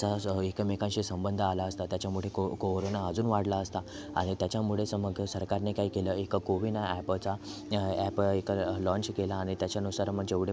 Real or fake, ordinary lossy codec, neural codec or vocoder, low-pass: real; none; none; none